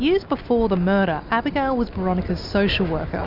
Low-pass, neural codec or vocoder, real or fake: 5.4 kHz; none; real